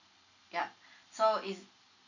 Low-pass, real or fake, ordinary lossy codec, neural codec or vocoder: 7.2 kHz; real; none; none